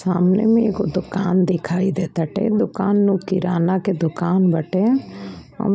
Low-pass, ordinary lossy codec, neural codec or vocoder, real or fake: none; none; none; real